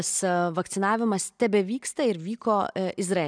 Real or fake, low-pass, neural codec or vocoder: real; 9.9 kHz; none